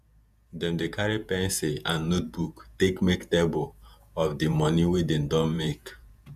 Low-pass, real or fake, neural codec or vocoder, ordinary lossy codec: 14.4 kHz; fake; vocoder, 48 kHz, 128 mel bands, Vocos; none